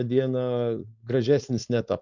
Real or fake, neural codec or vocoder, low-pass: fake; codec, 16 kHz, 4.8 kbps, FACodec; 7.2 kHz